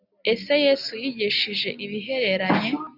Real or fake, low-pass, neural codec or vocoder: real; 5.4 kHz; none